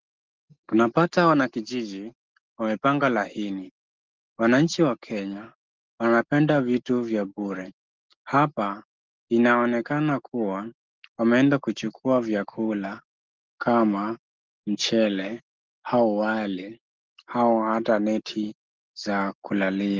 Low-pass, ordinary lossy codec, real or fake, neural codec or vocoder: 7.2 kHz; Opus, 16 kbps; real; none